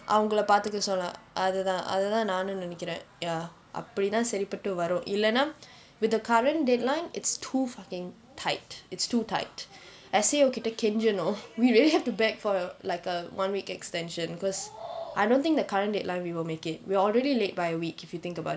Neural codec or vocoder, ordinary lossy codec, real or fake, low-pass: none; none; real; none